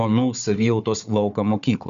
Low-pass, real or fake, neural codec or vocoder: 7.2 kHz; fake; codec, 16 kHz, 4 kbps, FunCodec, trained on Chinese and English, 50 frames a second